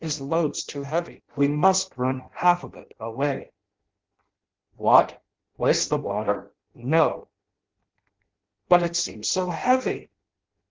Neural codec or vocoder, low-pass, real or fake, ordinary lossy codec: codec, 16 kHz in and 24 kHz out, 0.6 kbps, FireRedTTS-2 codec; 7.2 kHz; fake; Opus, 16 kbps